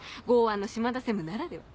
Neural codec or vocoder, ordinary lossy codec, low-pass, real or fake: none; none; none; real